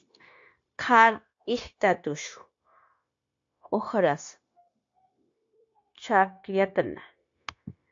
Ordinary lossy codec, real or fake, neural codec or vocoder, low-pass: AAC, 48 kbps; fake; codec, 16 kHz, 0.9 kbps, LongCat-Audio-Codec; 7.2 kHz